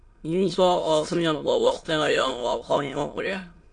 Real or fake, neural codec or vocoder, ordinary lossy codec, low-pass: fake; autoencoder, 22.05 kHz, a latent of 192 numbers a frame, VITS, trained on many speakers; AAC, 48 kbps; 9.9 kHz